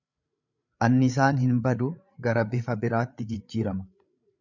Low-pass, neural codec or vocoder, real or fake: 7.2 kHz; codec, 16 kHz, 8 kbps, FreqCodec, larger model; fake